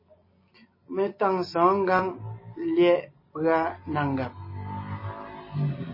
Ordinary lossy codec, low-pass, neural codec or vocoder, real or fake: MP3, 24 kbps; 5.4 kHz; none; real